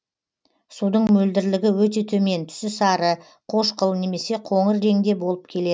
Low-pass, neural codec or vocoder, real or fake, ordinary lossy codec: none; none; real; none